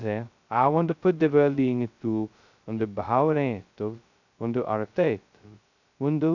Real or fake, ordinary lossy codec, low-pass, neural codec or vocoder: fake; none; 7.2 kHz; codec, 16 kHz, 0.2 kbps, FocalCodec